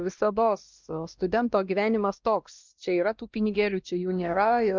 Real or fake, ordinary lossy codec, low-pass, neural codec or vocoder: fake; Opus, 24 kbps; 7.2 kHz; codec, 16 kHz, 1 kbps, X-Codec, WavLM features, trained on Multilingual LibriSpeech